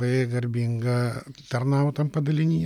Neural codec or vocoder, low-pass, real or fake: none; 19.8 kHz; real